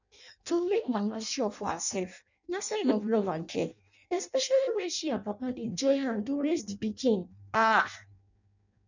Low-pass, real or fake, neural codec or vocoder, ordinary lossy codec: 7.2 kHz; fake; codec, 16 kHz in and 24 kHz out, 0.6 kbps, FireRedTTS-2 codec; none